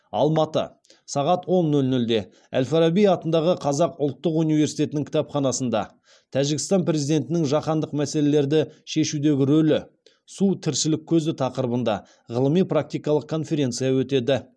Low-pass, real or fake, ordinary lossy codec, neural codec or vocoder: none; real; none; none